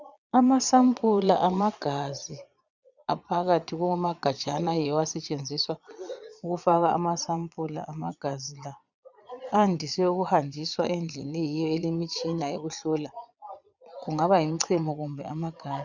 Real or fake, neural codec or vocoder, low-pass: fake; vocoder, 22.05 kHz, 80 mel bands, Vocos; 7.2 kHz